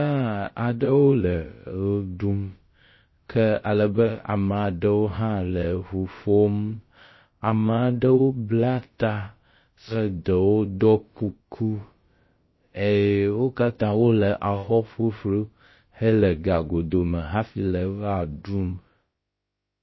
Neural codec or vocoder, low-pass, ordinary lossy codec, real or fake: codec, 16 kHz, about 1 kbps, DyCAST, with the encoder's durations; 7.2 kHz; MP3, 24 kbps; fake